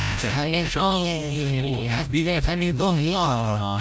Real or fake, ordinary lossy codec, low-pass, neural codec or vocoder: fake; none; none; codec, 16 kHz, 0.5 kbps, FreqCodec, larger model